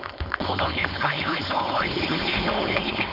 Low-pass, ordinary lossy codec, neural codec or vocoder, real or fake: 5.4 kHz; none; codec, 16 kHz, 4.8 kbps, FACodec; fake